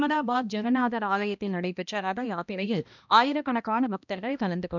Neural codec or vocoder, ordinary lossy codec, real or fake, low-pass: codec, 16 kHz, 1 kbps, X-Codec, HuBERT features, trained on balanced general audio; none; fake; 7.2 kHz